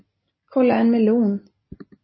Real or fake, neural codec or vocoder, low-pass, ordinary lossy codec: real; none; 7.2 kHz; MP3, 24 kbps